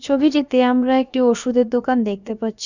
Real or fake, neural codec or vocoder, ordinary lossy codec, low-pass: fake; codec, 16 kHz, about 1 kbps, DyCAST, with the encoder's durations; none; 7.2 kHz